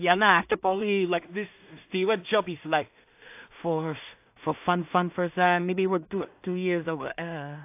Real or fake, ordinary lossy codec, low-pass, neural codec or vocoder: fake; AAC, 32 kbps; 3.6 kHz; codec, 16 kHz in and 24 kHz out, 0.4 kbps, LongCat-Audio-Codec, two codebook decoder